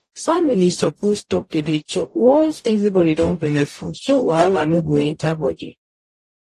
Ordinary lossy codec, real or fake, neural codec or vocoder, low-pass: AAC, 48 kbps; fake; codec, 44.1 kHz, 0.9 kbps, DAC; 14.4 kHz